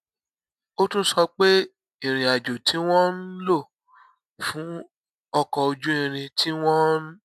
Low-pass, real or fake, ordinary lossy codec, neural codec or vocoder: 14.4 kHz; real; none; none